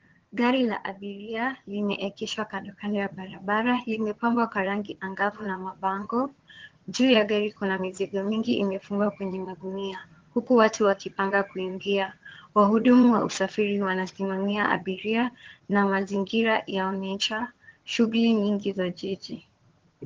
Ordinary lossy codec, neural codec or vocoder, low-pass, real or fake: Opus, 16 kbps; vocoder, 22.05 kHz, 80 mel bands, HiFi-GAN; 7.2 kHz; fake